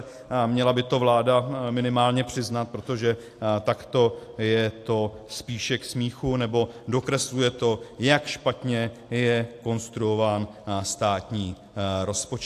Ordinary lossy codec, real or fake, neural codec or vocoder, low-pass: AAC, 64 kbps; real; none; 14.4 kHz